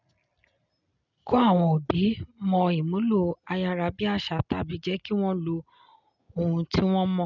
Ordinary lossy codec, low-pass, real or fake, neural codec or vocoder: none; 7.2 kHz; real; none